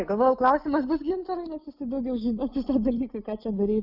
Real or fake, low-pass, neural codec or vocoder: real; 5.4 kHz; none